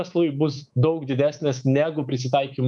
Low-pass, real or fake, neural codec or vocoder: 10.8 kHz; fake; codec, 24 kHz, 3.1 kbps, DualCodec